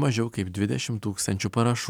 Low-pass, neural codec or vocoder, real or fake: 19.8 kHz; none; real